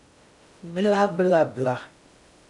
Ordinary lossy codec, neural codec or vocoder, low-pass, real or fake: none; codec, 16 kHz in and 24 kHz out, 0.6 kbps, FocalCodec, streaming, 2048 codes; 10.8 kHz; fake